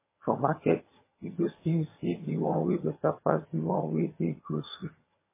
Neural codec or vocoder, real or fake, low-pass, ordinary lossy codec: vocoder, 22.05 kHz, 80 mel bands, HiFi-GAN; fake; 3.6 kHz; MP3, 16 kbps